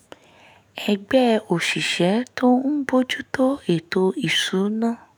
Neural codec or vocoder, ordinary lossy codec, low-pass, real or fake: autoencoder, 48 kHz, 128 numbers a frame, DAC-VAE, trained on Japanese speech; none; none; fake